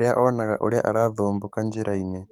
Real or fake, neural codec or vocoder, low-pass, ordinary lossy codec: fake; codec, 44.1 kHz, 7.8 kbps, DAC; 19.8 kHz; none